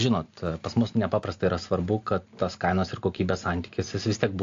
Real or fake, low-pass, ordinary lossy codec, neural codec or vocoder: real; 7.2 kHz; AAC, 48 kbps; none